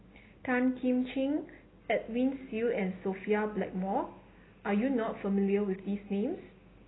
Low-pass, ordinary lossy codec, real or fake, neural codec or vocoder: 7.2 kHz; AAC, 16 kbps; real; none